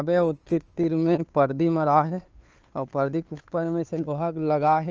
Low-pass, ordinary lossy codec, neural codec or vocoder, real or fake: 7.2 kHz; Opus, 24 kbps; codec, 16 kHz, 2 kbps, FunCodec, trained on Chinese and English, 25 frames a second; fake